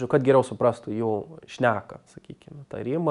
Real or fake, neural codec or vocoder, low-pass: real; none; 10.8 kHz